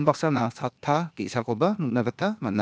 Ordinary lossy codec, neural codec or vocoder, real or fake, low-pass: none; codec, 16 kHz, 0.8 kbps, ZipCodec; fake; none